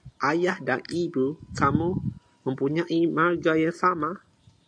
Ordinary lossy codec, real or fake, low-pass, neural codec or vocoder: AAC, 64 kbps; real; 9.9 kHz; none